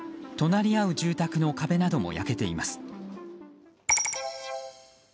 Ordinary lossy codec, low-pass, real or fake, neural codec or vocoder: none; none; real; none